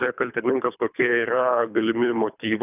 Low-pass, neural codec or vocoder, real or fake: 3.6 kHz; codec, 24 kHz, 3 kbps, HILCodec; fake